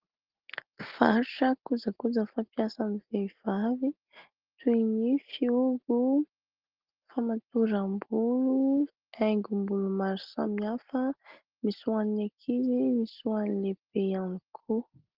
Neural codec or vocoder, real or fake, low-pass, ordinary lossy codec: none; real; 5.4 kHz; Opus, 16 kbps